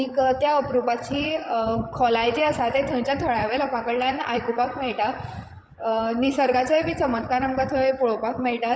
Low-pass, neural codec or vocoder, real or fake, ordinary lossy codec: none; codec, 16 kHz, 16 kbps, FreqCodec, larger model; fake; none